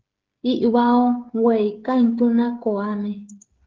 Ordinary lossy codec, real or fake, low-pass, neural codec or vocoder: Opus, 16 kbps; fake; 7.2 kHz; codec, 16 kHz, 16 kbps, FreqCodec, smaller model